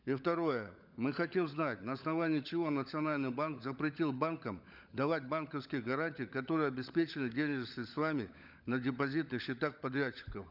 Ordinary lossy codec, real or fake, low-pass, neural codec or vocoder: none; fake; 5.4 kHz; codec, 16 kHz, 16 kbps, FunCodec, trained on LibriTTS, 50 frames a second